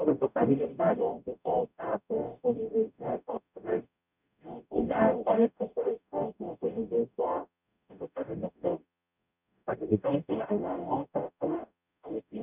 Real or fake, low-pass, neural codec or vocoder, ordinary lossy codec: fake; 3.6 kHz; codec, 44.1 kHz, 0.9 kbps, DAC; none